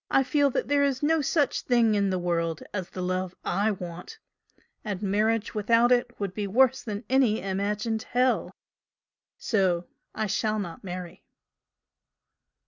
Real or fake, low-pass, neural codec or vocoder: real; 7.2 kHz; none